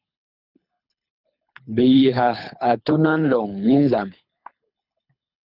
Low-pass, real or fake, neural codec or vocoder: 5.4 kHz; fake; codec, 24 kHz, 3 kbps, HILCodec